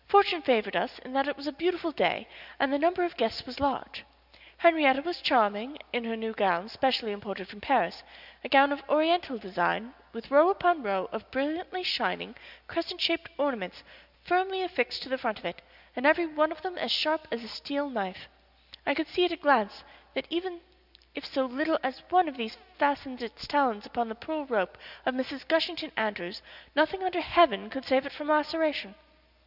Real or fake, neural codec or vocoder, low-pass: real; none; 5.4 kHz